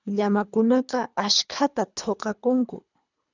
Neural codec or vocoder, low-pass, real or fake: codec, 24 kHz, 3 kbps, HILCodec; 7.2 kHz; fake